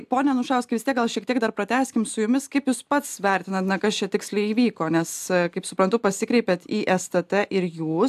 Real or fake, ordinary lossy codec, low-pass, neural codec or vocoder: real; AAC, 96 kbps; 14.4 kHz; none